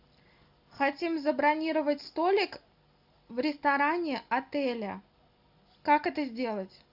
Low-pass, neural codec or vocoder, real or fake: 5.4 kHz; none; real